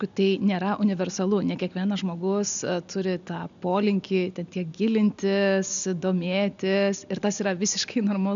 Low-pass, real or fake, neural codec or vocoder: 7.2 kHz; real; none